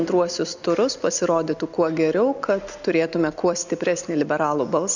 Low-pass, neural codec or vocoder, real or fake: 7.2 kHz; none; real